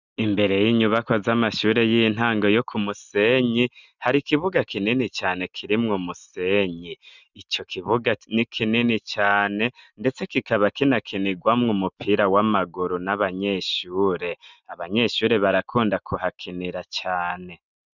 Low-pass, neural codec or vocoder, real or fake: 7.2 kHz; none; real